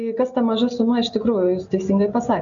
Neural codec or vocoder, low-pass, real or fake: none; 7.2 kHz; real